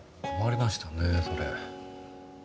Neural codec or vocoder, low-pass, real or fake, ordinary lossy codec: none; none; real; none